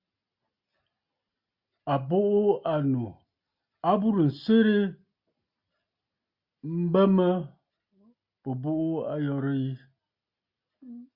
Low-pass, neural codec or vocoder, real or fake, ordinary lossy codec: 5.4 kHz; none; real; Opus, 64 kbps